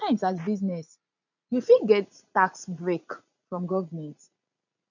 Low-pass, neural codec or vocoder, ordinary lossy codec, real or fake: 7.2 kHz; vocoder, 44.1 kHz, 80 mel bands, Vocos; none; fake